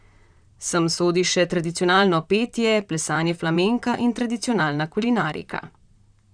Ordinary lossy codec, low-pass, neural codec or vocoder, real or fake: none; 9.9 kHz; vocoder, 44.1 kHz, 128 mel bands, Pupu-Vocoder; fake